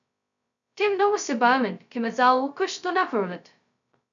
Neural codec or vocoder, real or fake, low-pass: codec, 16 kHz, 0.2 kbps, FocalCodec; fake; 7.2 kHz